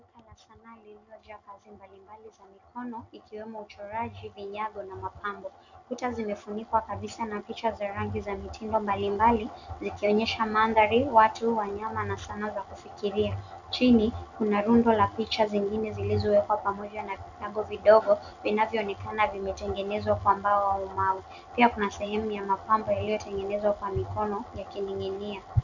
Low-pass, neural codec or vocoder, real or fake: 7.2 kHz; none; real